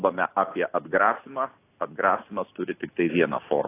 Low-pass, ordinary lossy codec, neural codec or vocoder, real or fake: 3.6 kHz; AAC, 24 kbps; none; real